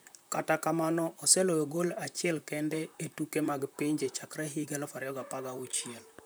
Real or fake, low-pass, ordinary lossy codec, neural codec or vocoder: fake; none; none; vocoder, 44.1 kHz, 128 mel bands, Pupu-Vocoder